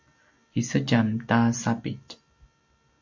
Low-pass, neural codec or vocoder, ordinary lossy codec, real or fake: 7.2 kHz; none; MP3, 64 kbps; real